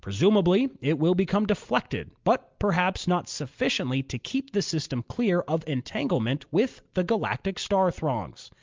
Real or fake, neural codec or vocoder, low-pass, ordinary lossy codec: real; none; 7.2 kHz; Opus, 32 kbps